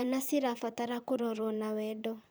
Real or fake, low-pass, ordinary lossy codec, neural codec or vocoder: fake; none; none; vocoder, 44.1 kHz, 128 mel bands, Pupu-Vocoder